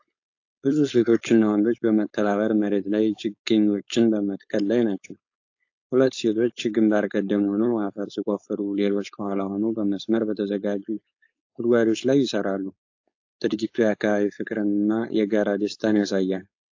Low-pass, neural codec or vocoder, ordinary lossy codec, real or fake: 7.2 kHz; codec, 16 kHz, 4.8 kbps, FACodec; AAC, 48 kbps; fake